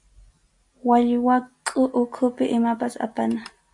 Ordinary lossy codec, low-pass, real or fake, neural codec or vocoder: AAC, 64 kbps; 10.8 kHz; real; none